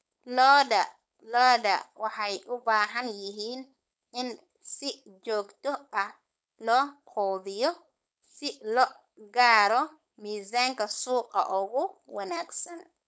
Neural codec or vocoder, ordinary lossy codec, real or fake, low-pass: codec, 16 kHz, 4.8 kbps, FACodec; none; fake; none